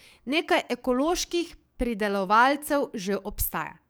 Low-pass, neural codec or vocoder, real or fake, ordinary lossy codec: none; codec, 44.1 kHz, 7.8 kbps, DAC; fake; none